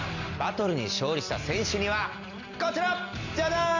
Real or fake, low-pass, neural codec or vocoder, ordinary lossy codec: real; 7.2 kHz; none; none